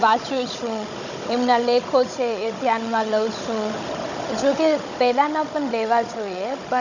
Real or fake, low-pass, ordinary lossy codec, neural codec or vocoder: fake; 7.2 kHz; none; codec, 16 kHz, 16 kbps, FunCodec, trained on Chinese and English, 50 frames a second